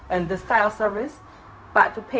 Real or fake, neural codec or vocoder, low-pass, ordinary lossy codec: fake; codec, 16 kHz, 0.4 kbps, LongCat-Audio-Codec; none; none